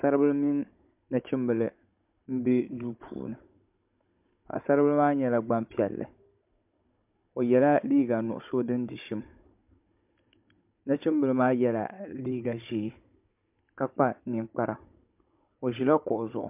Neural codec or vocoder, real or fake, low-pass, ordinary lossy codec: codec, 16 kHz, 16 kbps, FunCodec, trained on Chinese and English, 50 frames a second; fake; 3.6 kHz; MP3, 32 kbps